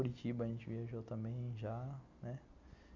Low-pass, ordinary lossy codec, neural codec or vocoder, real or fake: 7.2 kHz; Opus, 64 kbps; none; real